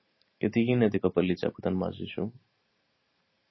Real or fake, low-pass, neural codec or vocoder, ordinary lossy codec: fake; 7.2 kHz; vocoder, 44.1 kHz, 128 mel bands every 512 samples, BigVGAN v2; MP3, 24 kbps